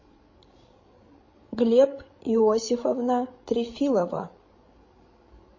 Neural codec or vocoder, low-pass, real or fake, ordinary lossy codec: codec, 16 kHz, 16 kbps, FreqCodec, larger model; 7.2 kHz; fake; MP3, 32 kbps